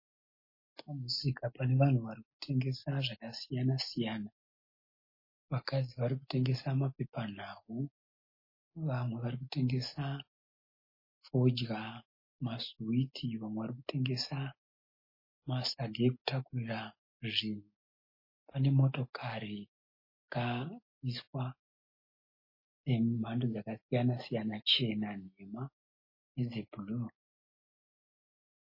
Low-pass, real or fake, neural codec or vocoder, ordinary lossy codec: 5.4 kHz; real; none; MP3, 24 kbps